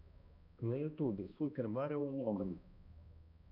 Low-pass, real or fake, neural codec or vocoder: 5.4 kHz; fake; codec, 16 kHz, 1 kbps, X-Codec, HuBERT features, trained on balanced general audio